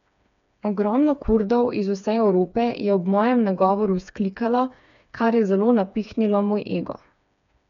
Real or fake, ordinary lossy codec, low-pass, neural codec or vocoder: fake; none; 7.2 kHz; codec, 16 kHz, 4 kbps, FreqCodec, smaller model